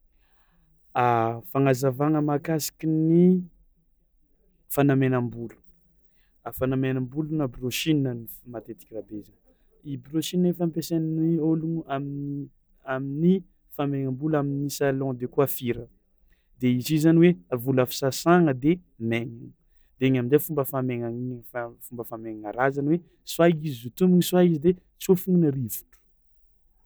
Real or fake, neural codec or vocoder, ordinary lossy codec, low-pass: real; none; none; none